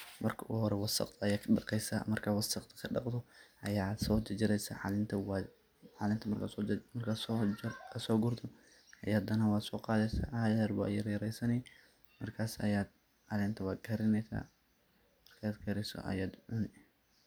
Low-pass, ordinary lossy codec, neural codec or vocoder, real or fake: none; none; none; real